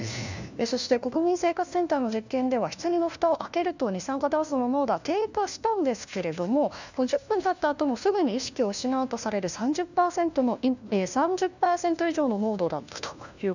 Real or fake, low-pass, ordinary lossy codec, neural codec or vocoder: fake; 7.2 kHz; none; codec, 16 kHz, 1 kbps, FunCodec, trained on LibriTTS, 50 frames a second